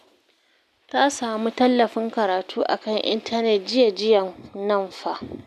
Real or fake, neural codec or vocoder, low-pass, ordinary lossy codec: real; none; 14.4 kHz; none